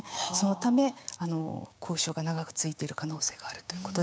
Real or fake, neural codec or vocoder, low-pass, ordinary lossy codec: fake; codec, 16 kHz, 6 kbps, DAC; none; none